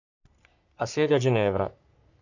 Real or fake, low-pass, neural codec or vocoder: fake; 7.2 kHz; codec, 44.1 kHz, 3.4 kbps, Pupu-Codec